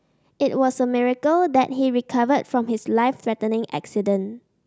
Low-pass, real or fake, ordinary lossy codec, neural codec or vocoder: none; real; none; none